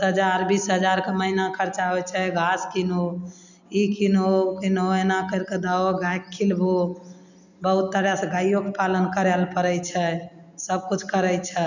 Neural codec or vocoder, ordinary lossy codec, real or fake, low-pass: none; none; real; 7.2 kHz